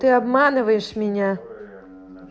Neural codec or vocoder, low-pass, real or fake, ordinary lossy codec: none; none; real; none